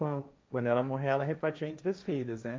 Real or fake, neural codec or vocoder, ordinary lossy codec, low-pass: fake; codec, 16 kHz, 1.1 kbps, Voila-Tokenizer; none; none